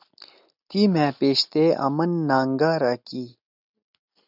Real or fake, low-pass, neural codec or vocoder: real; 5.4 kHz; none